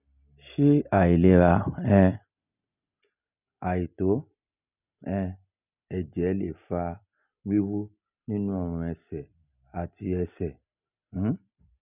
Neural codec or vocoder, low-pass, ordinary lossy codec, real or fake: none; 3.6 kHz; none; real